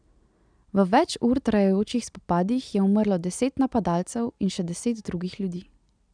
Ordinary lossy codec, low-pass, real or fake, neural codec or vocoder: none; 9.9 kHz; real; none